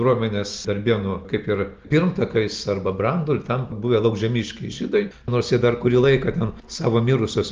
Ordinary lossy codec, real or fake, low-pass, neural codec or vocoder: Opus, 32 kbps; real; 7.2 kHz; none